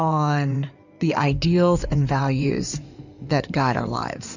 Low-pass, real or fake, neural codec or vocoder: 7.2 kHz; fake; codec, 16 kHz in and 24 kHz out, 2.2 kbps, FireRedTTS-2 codec